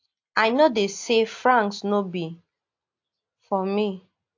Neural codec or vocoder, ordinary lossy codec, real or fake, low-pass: none; AAC, 48 kbps; real; 7.2 kHz